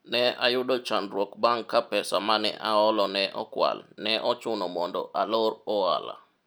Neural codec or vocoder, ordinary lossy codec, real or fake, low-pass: none; none; real; none